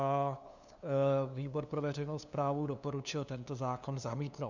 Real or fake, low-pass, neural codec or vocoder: fake; 7.2 kHz; codec, 16 kHz, 2 kbps, FunCodec, trained on LibriTTS, 25 frames a second